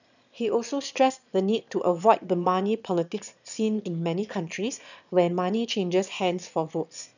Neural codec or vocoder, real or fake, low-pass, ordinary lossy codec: autoencoder, 22.05 kHz, a latent of 192 numbers a frame, VITS, trained on one speaker; fake; 7.2 kHz; none